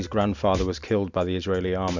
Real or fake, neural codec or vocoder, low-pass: real; none; 7.2 kHz